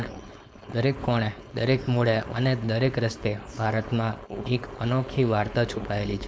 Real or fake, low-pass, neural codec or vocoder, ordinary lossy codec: fake; none; codec, 16 kHz, 4.8 kbps, FACodec; none